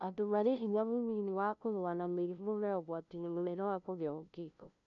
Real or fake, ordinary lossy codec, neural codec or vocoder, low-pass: fake; none; codec, 16 kHz, 0.5 kbps, FunCodec, trained on LibriTTS, 25 frames a second; 7.2 kHz